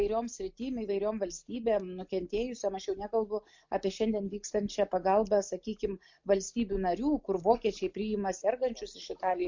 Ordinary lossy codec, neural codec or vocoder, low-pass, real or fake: MP3, 48 kbps; none; 7.2 kHz; real